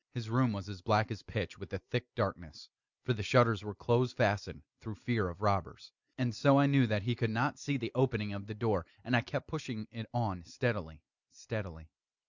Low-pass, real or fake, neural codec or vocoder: 7.2 kHz; real; none